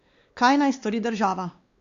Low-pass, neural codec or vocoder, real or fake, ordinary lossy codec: 7.2 kHz; codec, 16 kHz, 2 kbps, X-Codec, WavLM features, trained on Multilingual LibriSpeech; fake; Opus, 64 kbps